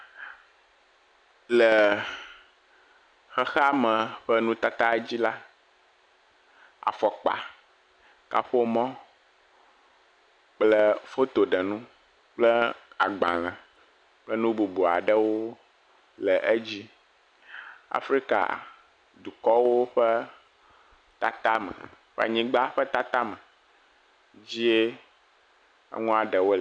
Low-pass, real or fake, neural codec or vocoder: 9.9 kHz; real; none